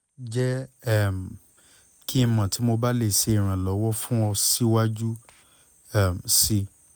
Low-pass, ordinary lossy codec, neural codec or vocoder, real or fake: none; none; none; real